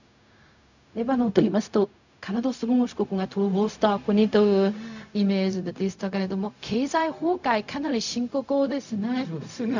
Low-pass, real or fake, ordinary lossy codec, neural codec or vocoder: 7.2 kHz; fake; none; codec, 16 kHz, 0.4 kbps, LongCat-Audio-Codec